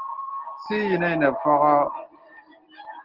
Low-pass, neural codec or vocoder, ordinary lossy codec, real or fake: 5.4 kHz; none; Opus, 16 kbps; real